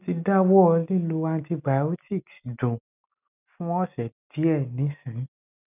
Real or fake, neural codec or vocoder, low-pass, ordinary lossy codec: real; none; 3.6 kHz; none